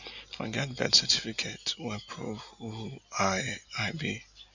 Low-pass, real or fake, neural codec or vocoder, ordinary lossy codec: 7.2 kHz; real; none; none